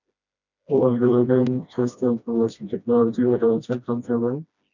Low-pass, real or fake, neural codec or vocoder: 7.2 kHz; fake; codec, 16 kHz, 1 kbps, FreqCodec, smaller model